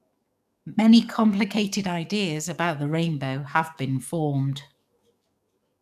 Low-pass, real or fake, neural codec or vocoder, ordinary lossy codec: 14.4 kHz; fake; codec, 44.1 kHz, 7.8 kbps, DAC; none